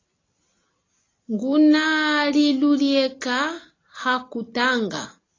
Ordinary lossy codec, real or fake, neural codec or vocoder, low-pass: AAC, 32 kbps; real; none; 7.2 kHz